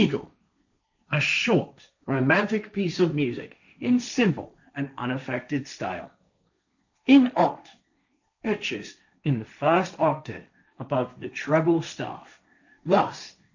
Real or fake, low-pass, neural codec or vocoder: fake; 7.2 kHz; codec, 16 kHz, 1.1 kbps, Voila-Tokenizer